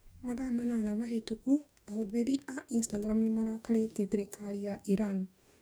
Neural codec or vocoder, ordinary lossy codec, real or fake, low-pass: codec, 44.1 kHz, 2.6 kbps, DAC; none; fake; none